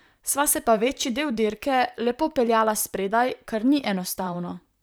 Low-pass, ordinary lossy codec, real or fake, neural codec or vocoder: none; none; fake; vocoder, 44.1 kHz, 128 mel bands, Pupu-Vocoder